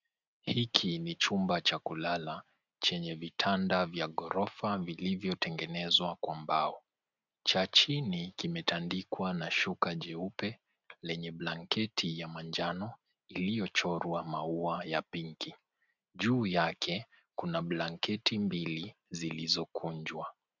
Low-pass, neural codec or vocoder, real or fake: 7.2 kHz; none; real